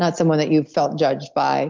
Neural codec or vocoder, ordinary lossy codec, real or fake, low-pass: none; Opus, 32 kbps; real; 7.2 kHz